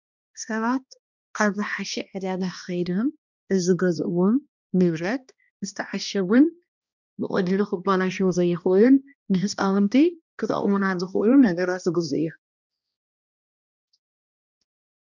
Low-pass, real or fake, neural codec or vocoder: 7.2 kHz; fake; codec, 16 kHz, 1 kbps, X-Codec, HuBERT features, trained on balanced general audio